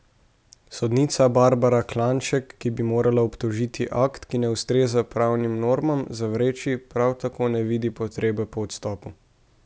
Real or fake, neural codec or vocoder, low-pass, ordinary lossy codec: real; none; none; none